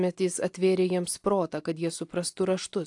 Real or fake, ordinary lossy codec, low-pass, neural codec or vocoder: real; AAC, 64 kbps; 10.8 kHz; none